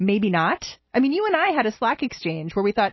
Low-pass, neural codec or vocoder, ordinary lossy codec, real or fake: 7.2 kHz; none; MP3, 24 kbps; real